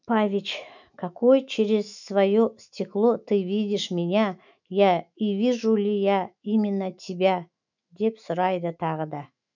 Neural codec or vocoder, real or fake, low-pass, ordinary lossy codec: autoencoder, 48 kHz, 128 numbers a frame, DAC-VAE, trained on Japanese speech; fake; 7.2 kHz; none